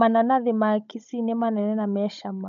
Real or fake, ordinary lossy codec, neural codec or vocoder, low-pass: fake; none; codec, 16 kHz, 16 kbps, FunCodec, trained on Chinese and English, 50 frames a second; 7.2 kHz